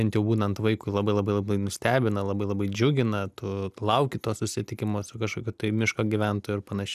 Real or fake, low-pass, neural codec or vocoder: real; 14.4 kHz; none